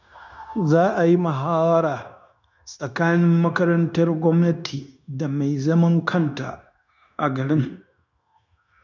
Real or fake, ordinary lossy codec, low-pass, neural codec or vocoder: fake; none; 7.2 kHz; codec, 16 kHz, 0.9 kbps, LongCat-Audio-Codec